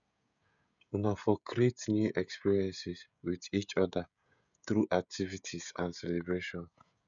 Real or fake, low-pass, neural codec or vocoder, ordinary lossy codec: fake; 7.2 kHz; codec, 16 kHz, 16 kbps, FreqCodec, smaller model; none